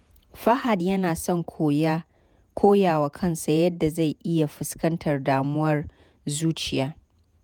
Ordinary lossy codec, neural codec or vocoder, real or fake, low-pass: none; vocoder, 48 kHz, 128 mel bands, Vocos; fake; none